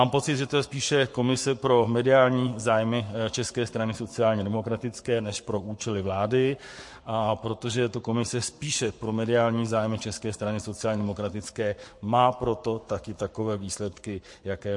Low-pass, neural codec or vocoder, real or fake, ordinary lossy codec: 10.8 kHz; codec, 44.1 kHz, 7.8 kbps, Pupu-Codec; fake; MP3, 48 kbps